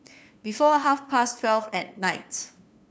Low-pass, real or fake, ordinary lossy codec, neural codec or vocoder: none; fake; none; codec, 16 kHz, 2 kbps, FunCodec, trained on LibriTTS, 25 frames a second